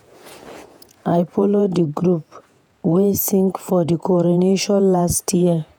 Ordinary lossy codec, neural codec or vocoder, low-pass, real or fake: none; vocoder, 48 kHz, 128 mel bands, Vocos; none; fake